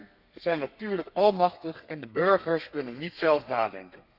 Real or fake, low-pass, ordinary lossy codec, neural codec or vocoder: fake; 5.4 kHz; none; codec, 32 kHz, 1.9 kbps, SNAC